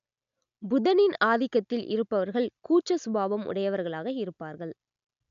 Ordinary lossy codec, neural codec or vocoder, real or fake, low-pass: none; none; real; 7.2 kHz